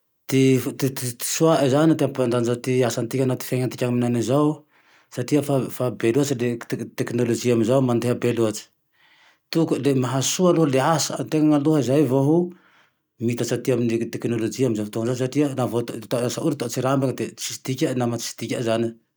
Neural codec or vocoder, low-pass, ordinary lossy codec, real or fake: none; none; none; real